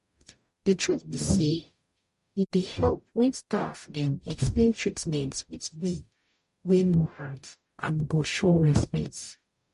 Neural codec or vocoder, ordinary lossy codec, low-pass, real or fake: codec, 44.1 kHz, 0.9 kbps, DAC; MP3, 48 kbps; 14.4 kHz; fake